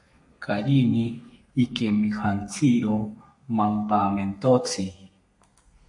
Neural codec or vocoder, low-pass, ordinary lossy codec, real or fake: codec, 32 kHz, 1.9 kbps, SNAC; 10.8 kHz; MP3, 48 kbps; fake